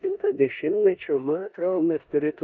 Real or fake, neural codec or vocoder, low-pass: fake; codec, 16 kHz in and 24 kHz out, 0.9 kbps, LongCat-Audio-Codec, four codebook decoder; 7.2 kHz